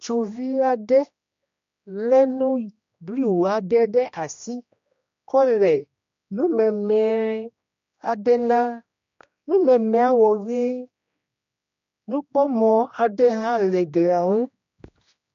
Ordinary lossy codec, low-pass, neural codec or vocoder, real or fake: MP3, 48 kbps; 7.2 kHz; codec, 16 kHz, 1 kbps, X-Codec, HuBERT features, trained on general audio; fake